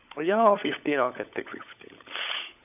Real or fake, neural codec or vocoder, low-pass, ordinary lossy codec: fake; codec, 16 kHz, 16 kbps, FunCodec, trained on LibriTTS, 50 frames a second; 3.6 kHz; none